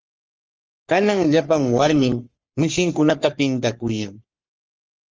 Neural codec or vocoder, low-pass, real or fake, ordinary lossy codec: codec, 44.1 kHz, 3.4 kbps, Pupu-Codec; 7.2 kHz; fake; Opus, 24 kbps